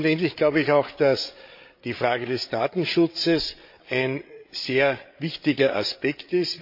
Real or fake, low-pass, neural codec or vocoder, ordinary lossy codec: fake; 5.4 kHz; codec, 16 kHz, 8 kbps, FreqCodec, larger model; MP3, 32 kbps